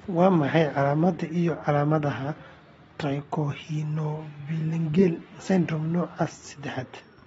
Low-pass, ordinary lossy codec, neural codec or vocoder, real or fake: 14.4 kHz; AAC, 24 kbps; none; real